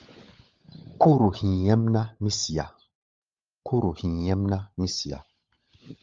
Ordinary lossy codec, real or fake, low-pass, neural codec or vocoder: Opus, 32 kbps; fake; 7.2 kHz; codec, 16 kHz, 16 kbps, FunCodec, trained on LibriTTS, 50 frames a second